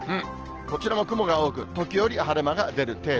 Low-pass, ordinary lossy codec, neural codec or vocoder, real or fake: 7.2 kHz; Opus, 16 kbps; none; real